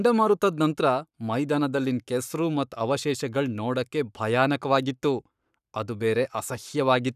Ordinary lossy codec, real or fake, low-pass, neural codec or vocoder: none; fake; 14.4 kHz; codec, 44.1 kHz, 7.8 kbps, Pupu-Codec